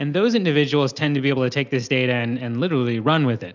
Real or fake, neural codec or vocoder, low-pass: real; none; 7.2 kHz